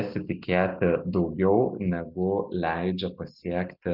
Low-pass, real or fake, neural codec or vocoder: 5.4 kHz; real; none